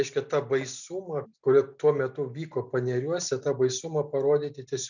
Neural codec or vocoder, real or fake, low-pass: none; real; 7.2 kHz